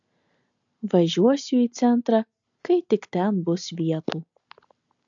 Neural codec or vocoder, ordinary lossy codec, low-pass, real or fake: none; AAC, 64 kbps; 7.2 kHz; real